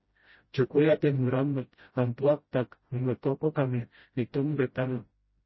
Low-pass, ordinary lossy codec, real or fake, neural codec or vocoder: 7.2 kHz; MP3, 24 kbps; fake; codec, 16 kHz, 0.5 kbps, FreqCodec, smaller model